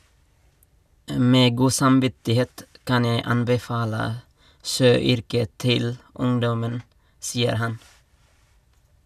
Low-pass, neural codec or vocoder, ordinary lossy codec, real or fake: 14.4 kHz; none; none; real